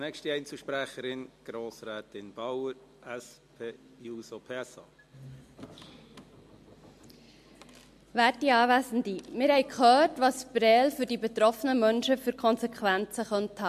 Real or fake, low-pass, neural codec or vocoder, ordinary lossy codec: real; 14.4 kHz; none; MP3, 64 kbps